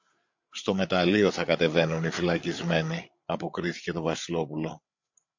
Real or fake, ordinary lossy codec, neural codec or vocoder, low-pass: fake; MP3, 48 kbps; codec, 16 kHz, 8 kbps, FreqCodec, larger model; 7.2 kHz